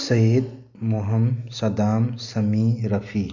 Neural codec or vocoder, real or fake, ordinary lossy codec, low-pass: none; real; none; 7.2 kHz